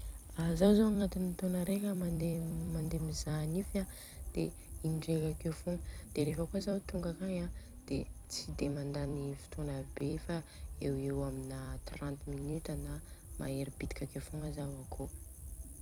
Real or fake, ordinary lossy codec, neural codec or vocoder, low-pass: fake; none; vocoder, 44.1 kHz, 128 mel bands every 256 samples, BigVGAN v2; none